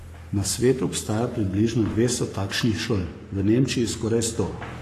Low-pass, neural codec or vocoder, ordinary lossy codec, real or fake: 14.4 kHz; codec, 44.1 kHz, 7.8 kbps, Pupu-Codec; AAC, 48 kbps; fake